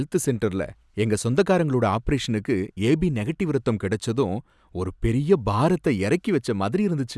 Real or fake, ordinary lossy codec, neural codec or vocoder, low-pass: real; none; none; none